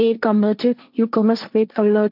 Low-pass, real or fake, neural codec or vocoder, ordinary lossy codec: 5.4 kHz; fake; codec, 16 kHz, 1.1 kbps, Voila-Tokenizer; none